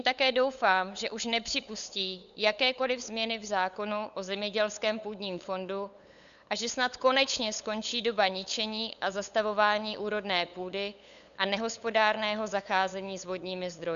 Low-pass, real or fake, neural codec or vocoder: 7.2 kHz; fake; codec, 16 kHz, 8 kbps, FunCodec, trained on LibriTTS, 25 frames a second